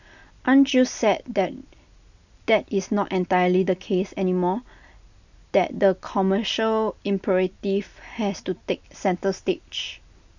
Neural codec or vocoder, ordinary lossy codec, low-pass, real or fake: none; none; 7.2 kHz; real